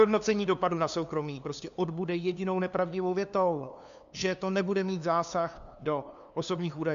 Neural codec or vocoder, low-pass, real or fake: codec, 16 kHz, 2 kbps, FunCodec, trained on LibriTTS, 25 frames a second; 7.2 kHz; fake